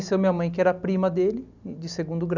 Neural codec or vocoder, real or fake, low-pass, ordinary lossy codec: none; real; 7.2 kHz; none